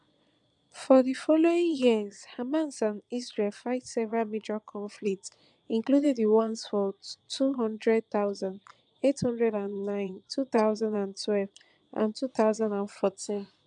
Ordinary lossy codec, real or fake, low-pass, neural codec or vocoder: none; fake; 9.9 kHz; vocoder, 22.05 kHz, 80 mel bands, Vocos